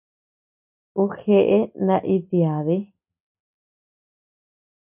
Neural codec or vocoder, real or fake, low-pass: none; real; 3.6 kHz